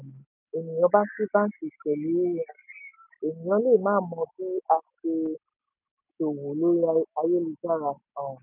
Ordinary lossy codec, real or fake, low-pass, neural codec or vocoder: none; real; 3.6 kHz; none